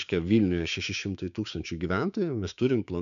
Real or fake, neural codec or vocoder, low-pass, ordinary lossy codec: fake; codec, 16 kHz, 6 kbps, DAC; 7.2 kHz; MP3, 96 kbps